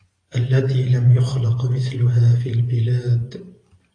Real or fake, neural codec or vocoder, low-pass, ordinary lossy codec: fake; vocoder, 44.1 kHz, 128 mel bands every 512 samples, BigVGAN v2; 9.9 kHz; AAC, 32 kbps